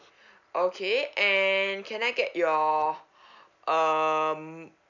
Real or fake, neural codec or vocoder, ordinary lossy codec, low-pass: real; none; none; 7.2 kHz